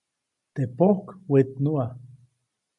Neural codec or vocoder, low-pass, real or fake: none; 10.8 kHz; real